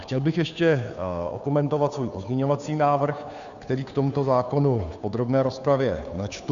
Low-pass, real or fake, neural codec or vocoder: 7.2 kHz; fake; codec, 16 kHz, 2 kbps, FunCodec, trained on Chinese and English, 25 frames a second